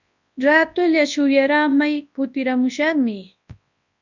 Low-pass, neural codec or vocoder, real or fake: 7.2 kHz; codec, 24 kHz, 0.9 kbps, WavTokenizer, large speech release; fake